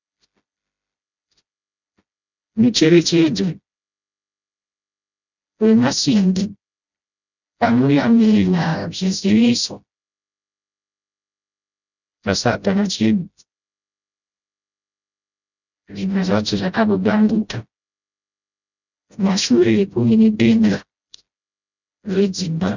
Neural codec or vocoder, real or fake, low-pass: codec, 16 kHz, 0.5 kbps, FreqCodec, smaller model; fake; 7.2 kHz